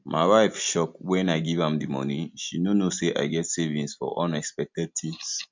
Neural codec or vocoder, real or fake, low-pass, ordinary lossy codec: none; real; 7.2 kHz; MP3, 64 kbps